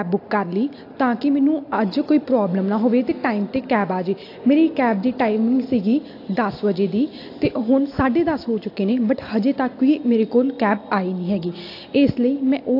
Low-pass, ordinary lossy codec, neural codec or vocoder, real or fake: 5.4 kHz; AAC, 32 kbps; none; real